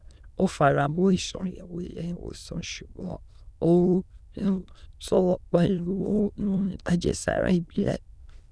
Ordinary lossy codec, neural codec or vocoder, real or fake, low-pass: none; autoencoder, 22.05 kHz, a latent of 192 numbers a frame, VITS, trained on many speakers; fake; none